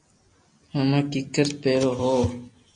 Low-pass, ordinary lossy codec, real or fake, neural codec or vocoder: 9.9 kHz; MP3, 48 kbps; real; none